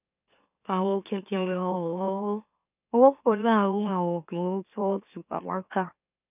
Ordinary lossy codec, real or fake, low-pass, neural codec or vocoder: none; fake; 3.6 kHz; autoencoder, 44.1 kHz, a latent of 192 numbers a frame, MeloTTS